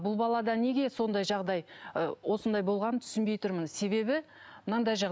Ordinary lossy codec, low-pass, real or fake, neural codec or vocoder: none; none; real; none